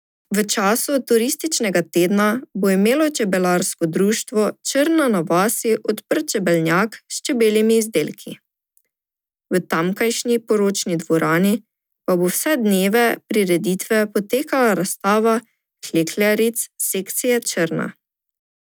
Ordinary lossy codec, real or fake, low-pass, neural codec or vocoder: none; real; none; none